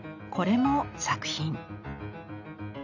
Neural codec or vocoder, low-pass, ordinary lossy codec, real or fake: none; 7.2 kHz; none; real